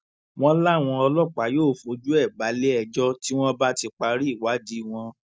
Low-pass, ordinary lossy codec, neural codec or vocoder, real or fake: none; none; none; real